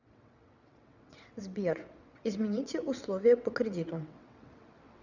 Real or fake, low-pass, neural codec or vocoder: fake; 7.2 kHz; vocoder, 22.05 kHz, 80 mel bands, WaveNeXt